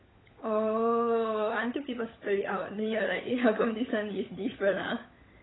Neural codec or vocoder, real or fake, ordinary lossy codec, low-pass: codec, 16 kHz, 16 kbps, FunCodec, trained on LibriTTS, 50 frames a second; fake; AAC, 16 kbps; 7.2 kHz